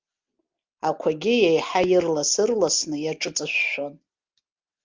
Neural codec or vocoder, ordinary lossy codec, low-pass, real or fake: none; Opus, 24 kbps; 7.2 kHz; real